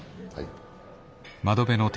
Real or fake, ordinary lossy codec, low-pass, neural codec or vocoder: real; none; none; none